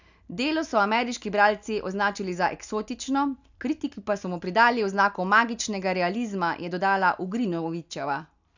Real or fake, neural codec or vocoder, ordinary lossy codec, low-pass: real; none; none; 7.2 kHz